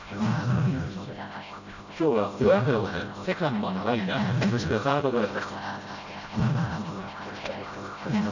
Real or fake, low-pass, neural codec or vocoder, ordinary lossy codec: fake; 7.2 kHz; codec, 16 kHz, 0.5 kbps, FreqCodec, smaller model; none